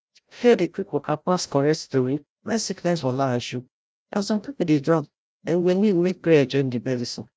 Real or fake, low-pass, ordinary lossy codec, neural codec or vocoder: fake; none; none; codec, 16 kHz, 0.5 kbps, FreqCodec, larger model